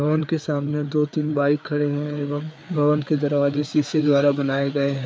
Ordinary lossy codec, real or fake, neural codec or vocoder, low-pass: none; fake; codec, 16 kHz, 4 kbps, FreqCodec, larger model; none